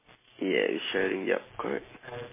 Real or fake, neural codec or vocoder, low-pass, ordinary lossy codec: real; none; 3.6 kHz; MP3, 16 kbps